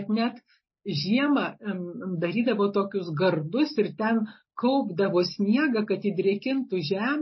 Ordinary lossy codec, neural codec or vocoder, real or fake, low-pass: MP3, 24 kbps; none; real; 7.2 kHz